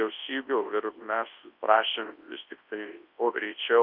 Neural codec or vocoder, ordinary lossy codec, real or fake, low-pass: codec, 24 kHz, 0.9 kbps, WavTokenizer, large speech release; Opus, 24 kbps; fake; 5.4 kHz